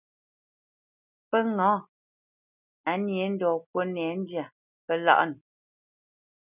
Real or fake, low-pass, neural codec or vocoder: real; 3.6 kHz; none